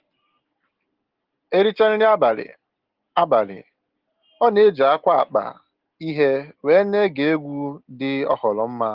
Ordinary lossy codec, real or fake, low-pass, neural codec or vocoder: Opus, 16 kbps; real; 5.4 kHz; none